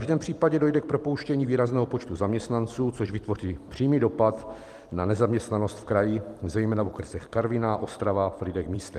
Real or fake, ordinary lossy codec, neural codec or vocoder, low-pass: fake; Opus, 24 kbps; vocoder, 44.1 kHz, 128 mel bands every 256 samples, BigVGAN v2; 14.4 kHz